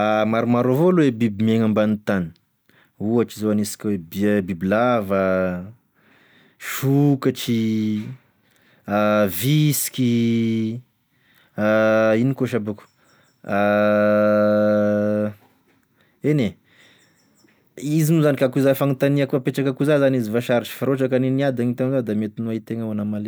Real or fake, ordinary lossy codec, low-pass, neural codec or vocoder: real; none; none; none